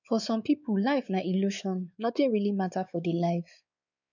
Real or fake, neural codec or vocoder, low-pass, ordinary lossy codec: fake; codec, 16 kHz, 4 kbps, X-Codec, WavLM features, trained on Multilingual LibriSpeech; 7.2 kHz; none